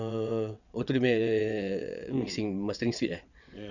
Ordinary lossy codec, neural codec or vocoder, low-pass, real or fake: none; vocoder, 22.05 kHz, 80 mel bands, WaveNeXt; 7.2 kHz; fake